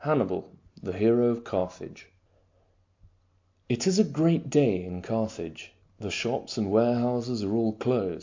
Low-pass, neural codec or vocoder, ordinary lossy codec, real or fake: 7.2 kHz; none; MP3, 48 kbps; real